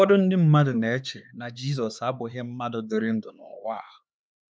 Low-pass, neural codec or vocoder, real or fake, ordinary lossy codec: none; codec, 16 kHz, 4 kbps, X-Codec, HuBERT features, trained on LibriSpeech; fake; none